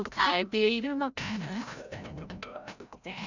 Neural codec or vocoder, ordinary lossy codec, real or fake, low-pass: codec, 16 kHz, 0.5 kbps, FreqCodec, larger model; none; fake; 7.2 kHz